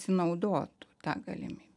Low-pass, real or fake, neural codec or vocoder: 10.8 kHz; real; none